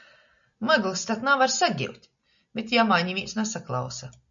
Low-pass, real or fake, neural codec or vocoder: 7.2 kHz; real; none